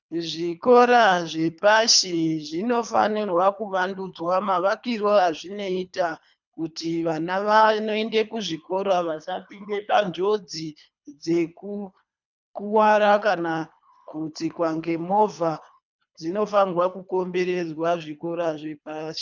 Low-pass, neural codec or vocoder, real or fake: 7.2 kHz; codec, 24 kHz, 3 kbps, HILCodec; fake